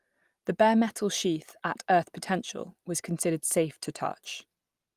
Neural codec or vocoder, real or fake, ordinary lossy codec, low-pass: none; real; Opus, 32 kbps; 14.4 kHz